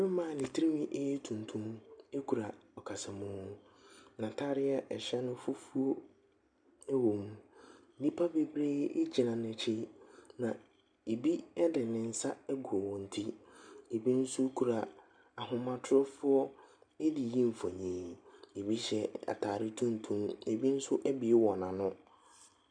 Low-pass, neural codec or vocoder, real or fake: 9.9 kHz; none; real